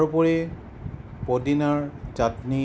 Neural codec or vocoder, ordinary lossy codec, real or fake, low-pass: none; none; real; none